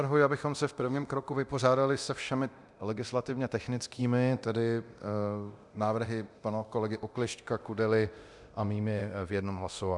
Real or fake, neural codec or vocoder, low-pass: fake; codec, 24 kHz, 0.9 kbps, DualCodec; 10.8 kHz